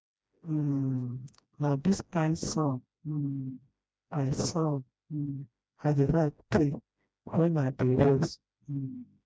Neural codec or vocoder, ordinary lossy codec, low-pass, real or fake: codec, 16 kHz, 1 kbps, FreqCodec, smaller model; none; none; fake